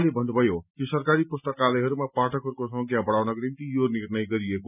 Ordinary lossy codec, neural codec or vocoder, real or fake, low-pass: none; none; real; 3.6 kHz